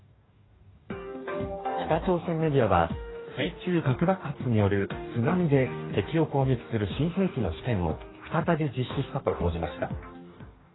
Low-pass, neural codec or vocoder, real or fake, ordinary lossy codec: 7.2 kHz; codec, 44.1 kHz, 2.6 kbps, DAC; fake; AAC, 16 kbps